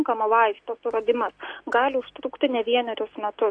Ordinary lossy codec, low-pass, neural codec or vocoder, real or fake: AAC, 48 kbps; 9.9 kHz; none; real